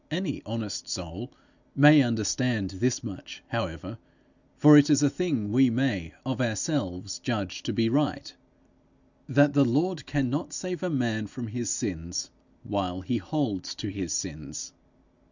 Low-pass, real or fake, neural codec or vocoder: 7.2 kHz; real; none